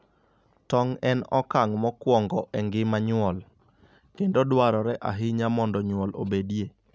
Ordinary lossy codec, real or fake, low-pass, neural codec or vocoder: none; real; none; none